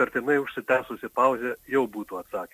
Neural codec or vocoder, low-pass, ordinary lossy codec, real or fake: none; 14.4 kHz; MP3, 64 kbps; real